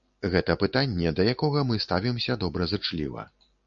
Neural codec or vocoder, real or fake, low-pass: none; real; 7.2 kHz